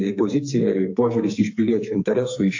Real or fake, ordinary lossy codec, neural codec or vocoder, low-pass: fake; AAC, 48 kbps; codec, 44.1 kHz, 2.6 kbps, SNAC; 7.2 kHz